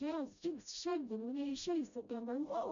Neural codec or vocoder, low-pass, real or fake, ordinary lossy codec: codec, 16 kHz, 0.5 kbps, FreqCodec, smaller model; 7.2 kHz; fake; MP3, 64 kbps